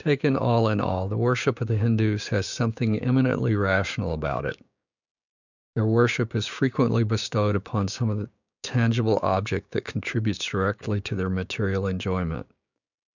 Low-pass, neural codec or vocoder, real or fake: 7.2 kHz; codec, 16 kHz, 6 kbps, DAC; fake